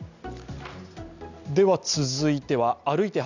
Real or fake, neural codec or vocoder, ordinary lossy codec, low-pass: real; none; none; 7.2 kHz